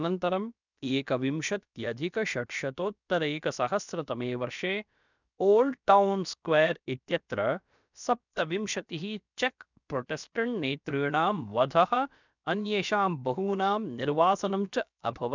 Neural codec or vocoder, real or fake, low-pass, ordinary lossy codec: codec, 16 kHz, about 1 kbps, DyCAST, with the encoder's durations; fake; 7.2 kHz; none